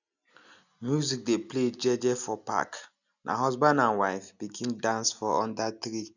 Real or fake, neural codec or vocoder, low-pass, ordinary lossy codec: real; none; 7.2 kHz; none